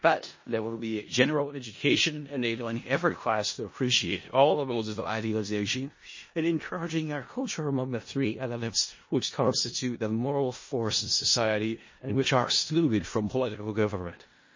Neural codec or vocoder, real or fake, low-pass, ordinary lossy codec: codec, 16 kHz in and 24 kHz out, 0.4 kbps, LongCat-Audio-Codec, four codebook decoder; fake; 7.2 kHz; MP3, 32 kbps